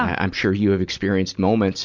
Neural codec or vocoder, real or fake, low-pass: none; real; 7.2 kHz